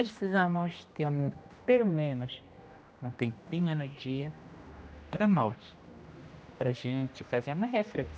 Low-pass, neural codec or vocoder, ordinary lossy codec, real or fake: none; codec, 16 kHz, 1 kbps, X-Codec, HuBERT features, trained on general audio; none; fake